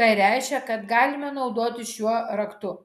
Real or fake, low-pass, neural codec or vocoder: real; 14.4 kHz; none